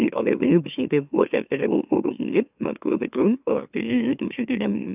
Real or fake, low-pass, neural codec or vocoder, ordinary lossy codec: fake; 3.6 kHz; autoencoder, 44.1 kHz, a latent of 192 numbers a frame, MeloTTS; none